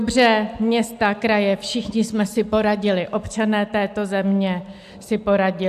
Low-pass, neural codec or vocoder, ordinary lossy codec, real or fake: 14.4 kHz; none; AAC, 96 kbps; real